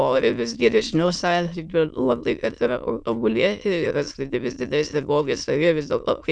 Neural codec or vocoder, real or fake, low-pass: autoencoder, 22.05 kHz, a latent of 192 numbers a frame, VITS, trained on many speakers; fake; 9.9 kHz